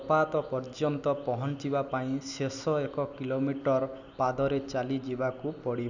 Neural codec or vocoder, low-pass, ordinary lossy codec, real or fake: none; 7.2 kHz; none; real